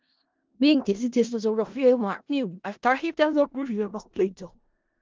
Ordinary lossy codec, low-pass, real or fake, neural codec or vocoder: Opus, 24 kbps; 7.2 kHz; fake; codec, 16 kHz in and 24 kHz out, 0.4 kbps, LongCat-Audio-Codec, four codebook decoder